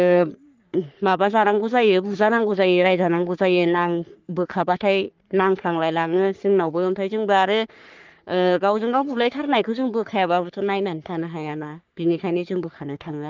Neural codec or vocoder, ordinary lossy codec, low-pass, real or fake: codec, 44.1 kHz, 3.4 kbps, Pupu-Codec; Opus, 32 kbps; 7.2 kHz; fake